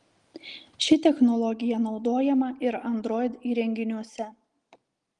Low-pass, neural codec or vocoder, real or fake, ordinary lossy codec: 10.8 kHz; none; real; Opus, 24 kbps